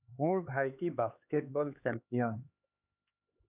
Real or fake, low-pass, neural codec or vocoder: fake; 3.6 kHz; codec, 16 kHz, 2 kbps, X-Codec, HuBERT features, trained on LibriSpeech